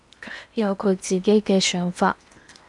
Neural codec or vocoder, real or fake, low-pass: codec, 16 kHz in and 24 kHz out, 0.8 kbps, FocalCodec, streaming, 65536 codes; fake; 10.8 kHz